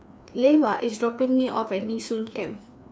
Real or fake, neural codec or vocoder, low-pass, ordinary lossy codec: fake; codec, 16 kHz, 2 kbps, FreqCodec, larger model; none; none